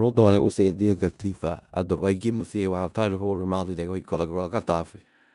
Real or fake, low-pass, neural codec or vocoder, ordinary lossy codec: fake; 10.8 kHz; codec, 16 kHz in and 24 kHz out, 0.4 kbps, LongCat-Audio-Codec, four codebook decoder; none